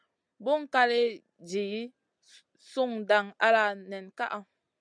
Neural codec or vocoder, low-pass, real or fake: none; 9.9 kHz; real